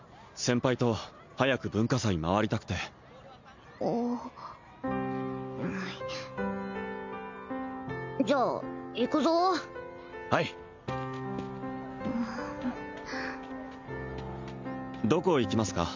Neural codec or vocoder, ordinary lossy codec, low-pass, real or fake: none; MP3, 64 kbps; 7.2 kHz; real